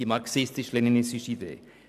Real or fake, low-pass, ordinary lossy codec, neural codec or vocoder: real; 14.4 kHz; none; none